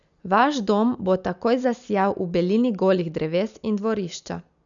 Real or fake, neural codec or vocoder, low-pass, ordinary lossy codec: real; none; 7.2 kHz; none